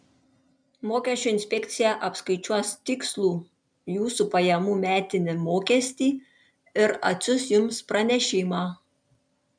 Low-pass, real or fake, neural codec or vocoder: 9.9 kHz; real; none